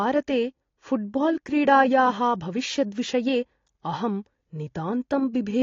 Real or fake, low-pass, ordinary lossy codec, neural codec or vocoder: real; 7.2 kHz; AAC, 32 kbps; none